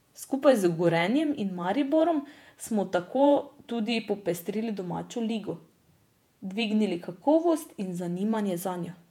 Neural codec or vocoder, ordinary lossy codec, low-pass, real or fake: vocoder, 48 kHz, 128 mel bands, Vocos; MP3, 96 kbps; 19.8 kHz; fake